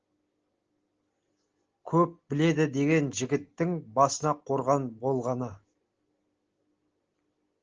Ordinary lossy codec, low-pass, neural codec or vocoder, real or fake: Opus, 16 kbps; 7.2 kHz; none; real